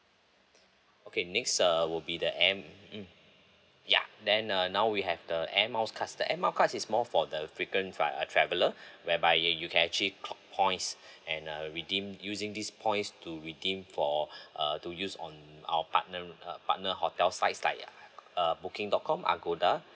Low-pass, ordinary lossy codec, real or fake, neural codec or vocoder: none; none; real; none